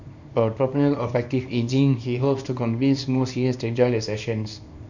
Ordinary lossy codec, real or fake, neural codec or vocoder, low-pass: none; fake; codec, 24 kHz, 0.9 kbps, WavTokenizer, small release; 7.2 kHz